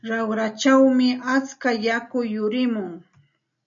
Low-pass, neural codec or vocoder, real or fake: 7.2 kHz; none; real